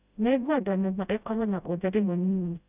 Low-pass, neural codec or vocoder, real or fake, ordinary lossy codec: 3.6 kHz; codec, 16 kHz, 0.5 kbps, FreqCodec, smaller model; fake; none